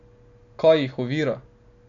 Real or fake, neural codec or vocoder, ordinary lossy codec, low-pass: real; none; none; 7.2 kHz